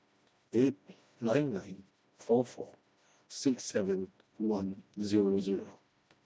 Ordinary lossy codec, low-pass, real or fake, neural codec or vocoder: none; none; fake; codec, 16 kHz, 1 kbps, FreqCodec, smaller model